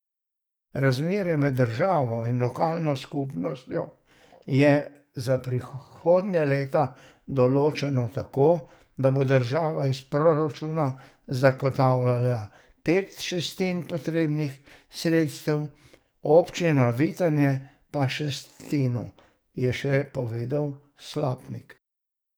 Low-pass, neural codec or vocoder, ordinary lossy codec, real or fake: none; codec, 44.1 kHz, 2.6 kbps, SNAC; none; fake